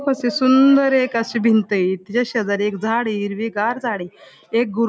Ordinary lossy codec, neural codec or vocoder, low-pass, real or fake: none; none; none; real